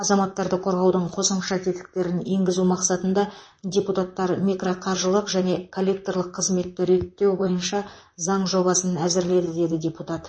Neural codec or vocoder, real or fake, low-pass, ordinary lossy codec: vocoder, 44.1 kHz, 128 mel bands, Pupu-Vocoder; fake; 9.9 kHz; MP3, 32 kbps